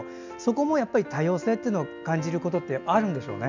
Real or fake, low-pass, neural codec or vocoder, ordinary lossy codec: real; 7.2 kHz; none; none